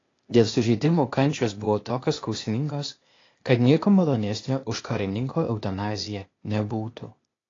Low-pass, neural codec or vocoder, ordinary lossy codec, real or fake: 7.2 kHz; codec, 16 kHz, 0.8 kbps, ZipCodec; AAC, 32 kbps; fake